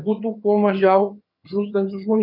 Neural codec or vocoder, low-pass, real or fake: vocoder, 22.05 kHz, 80 mel bands, HiFi-GAN; 5.4 kHz; fake